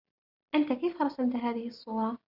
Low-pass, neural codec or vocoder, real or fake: 5.4 kHz; none; real